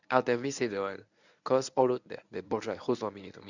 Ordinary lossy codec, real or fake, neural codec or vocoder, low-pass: none; fake; codec, 24 kHz, 0.9 kbps, WavTokenizer, medium speech release version 1; 7.2 kHz